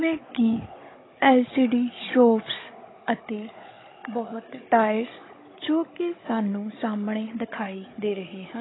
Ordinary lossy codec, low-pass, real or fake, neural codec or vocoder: AAC, 16 kbps; 7.2 kHz; fake; codec, 16 kHz, 16 kbps, FunCodec, trained on Chinese and English, 50 frames a second